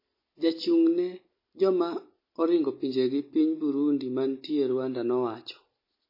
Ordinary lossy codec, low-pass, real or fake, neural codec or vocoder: MP3, 24 kbps; 5.4 kHz; real; none